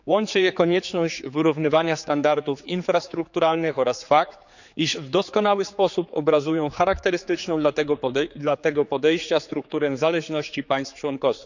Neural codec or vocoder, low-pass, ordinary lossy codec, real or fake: codec, 16 kHz, 4 kbps, X-Codec, HuBERT features, trained on general audio; 7.2 kHz; none; fake